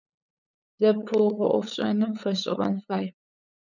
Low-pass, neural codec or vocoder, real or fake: 7.2 kHz; codec, 16 kHz, 8 kbps, FunCodec, trained on LibriTTS, 25 frames a second; fake